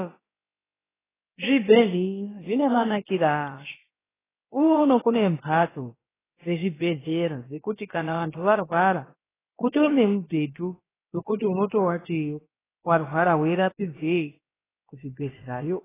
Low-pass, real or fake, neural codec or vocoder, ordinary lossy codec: 3.6 kHz; fake; codec, 16 kHz, about 1 kbps, DyCAST, with the encoder's durations; AAC, 16 kbps